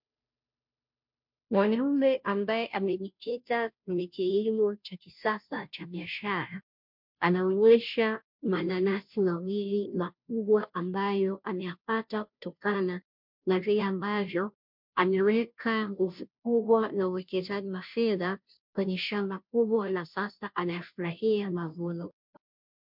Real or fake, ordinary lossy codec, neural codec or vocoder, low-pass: fake; MP3, 48 kbps; codec, 16 kHz, 0.5 kbps, FunCodec, trained on Chinese and English, 25 frames a second; 5.4 kHz